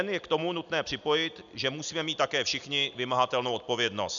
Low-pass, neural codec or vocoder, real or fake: 7.2 kHz; none; real